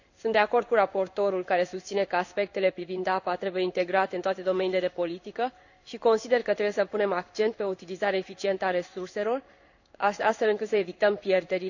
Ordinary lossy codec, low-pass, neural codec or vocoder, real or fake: none; 7.2 kHz; codec, 16 kHz in and 24 kHz out, 1 kbps, XY-Tokenizer; fake